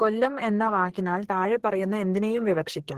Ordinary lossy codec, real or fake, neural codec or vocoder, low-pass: Opus, 16 kbps; fake; codec, 44.1 kHz, 2.6 kbps, SNAC; 14.4 kHz